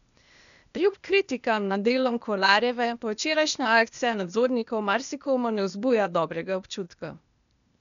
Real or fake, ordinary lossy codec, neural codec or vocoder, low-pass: fake; none; codec, 16 kHz, 0.8 kbps, ZipCodec; 7.2 kHz